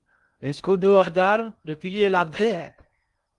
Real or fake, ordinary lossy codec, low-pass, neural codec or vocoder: fake; Opus, 24 kbps; 10.8 kHz; codec, 16 kHz in and 24 kHz out, 0.6 kbps, FocalCodec, streaming, 2048 codes